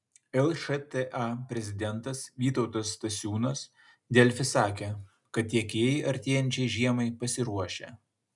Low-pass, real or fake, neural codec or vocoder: 10.8 kHz; real; none